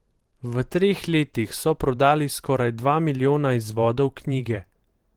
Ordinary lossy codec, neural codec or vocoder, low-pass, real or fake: Opus, 16 kbps; vocoder, 44.1 kHz, 128 mel bands every 512 samples, BigVGAN v2; 19.8 kHz; fake